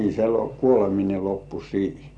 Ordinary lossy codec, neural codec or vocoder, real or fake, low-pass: none; none; real; 9.9 kHz